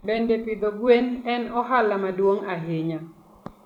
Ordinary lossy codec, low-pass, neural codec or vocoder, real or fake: none; 19.8 kHz; vocoder, 44.1 kHz, 128 mel bands every 256 samples, BigVGAN v2; fake